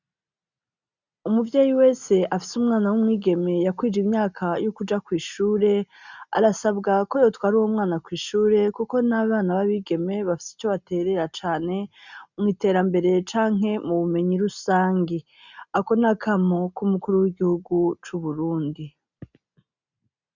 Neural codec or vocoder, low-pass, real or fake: none; 7.2 kHz; real